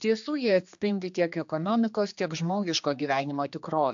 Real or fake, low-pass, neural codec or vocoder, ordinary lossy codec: fake; 7.2 kHz; codec, 16 kHz, 2 kbps, X-Codec, HuBERT features, trained on general audio; AAC, 64 kbps